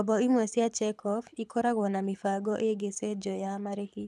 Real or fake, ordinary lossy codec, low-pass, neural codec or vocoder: fake; none; none; codec, 24 kHz, 6 kbps, HILCodec